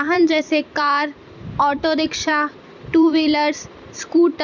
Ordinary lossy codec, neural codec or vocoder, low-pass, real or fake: none; vocoder, 44.1 kHz, 128 mel bands every 256 samples, BigVGAN v2; 7.2 kHz; fake